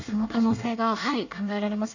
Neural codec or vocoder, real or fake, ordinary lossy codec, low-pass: codec, 24 kHz, 1 kbps, SNAC; fake; none; 7.2 kHz